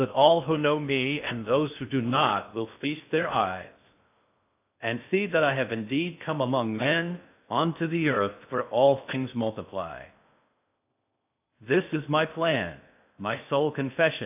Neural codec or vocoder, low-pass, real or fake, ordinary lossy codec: codec, 16 kHz in and 24 kHz out, 0.6 kbps, FocalCodec, streaming, 2048 codes; 3.6 kHz; fake; AAC, 32 kbps